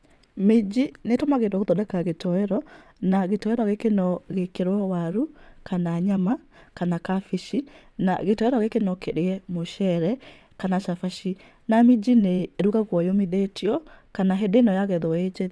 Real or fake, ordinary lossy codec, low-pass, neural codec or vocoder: fake; none; none; vocoder, 22.05 kHz, 80 mel bands, WaveNeXt